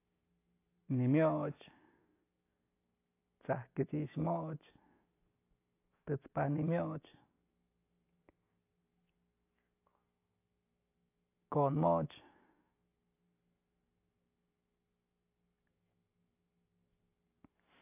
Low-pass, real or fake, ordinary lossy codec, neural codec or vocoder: 3.6 kHz; real; AAC, 24 kbps; none